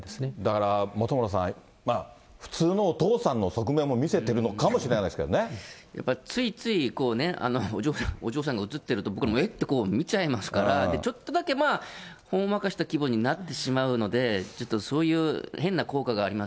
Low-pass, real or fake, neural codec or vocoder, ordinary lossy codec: none; real; none; none